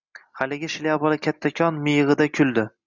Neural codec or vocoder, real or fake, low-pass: none; real; 7.2 kHz